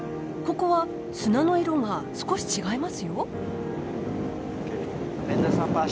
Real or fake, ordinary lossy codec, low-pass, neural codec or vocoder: real; none; none; none